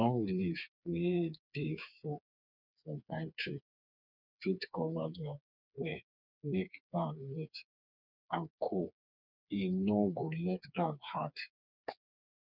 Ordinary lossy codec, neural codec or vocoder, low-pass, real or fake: none; codec, 16 kHz, 4 kbps, FreqCodec, smaller model; 5.4 kHz; fake